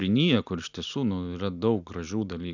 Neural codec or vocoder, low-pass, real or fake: none; 7.2 kHz; real